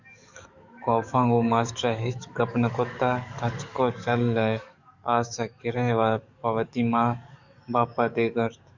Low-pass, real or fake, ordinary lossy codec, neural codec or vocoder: 7.2 kHz; fake; Opus, 64 kbps; codec, 44.1 kHz, 7.8 kbps, DAC